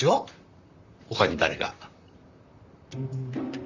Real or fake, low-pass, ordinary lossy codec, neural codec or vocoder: fake; 7.2 kHz; none; vocoder, 44.1 kHz, 128 mel bands, Pupu-Vocoder